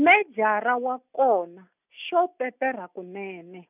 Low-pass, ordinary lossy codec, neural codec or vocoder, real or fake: 3.6 kHz; none; none; real